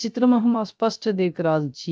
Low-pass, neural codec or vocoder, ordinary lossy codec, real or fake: none; codec, 16 kHz, 0.3 kbps, FocalCodec; none; fake